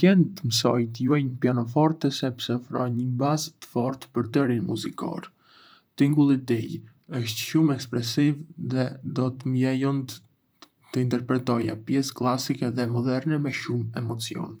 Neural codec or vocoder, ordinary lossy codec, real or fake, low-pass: vocoder, 44.1 kHz, 128 mel bands, Pupu-Vocoder; none; fake; none